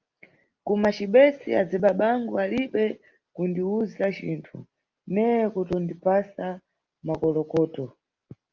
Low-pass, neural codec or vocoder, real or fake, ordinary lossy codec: 7.2 kHz; none; real; Opus, 24 kbps